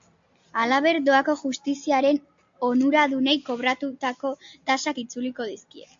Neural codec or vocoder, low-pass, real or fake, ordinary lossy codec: none; 7.2 kHz; real; AAC, 64 kbps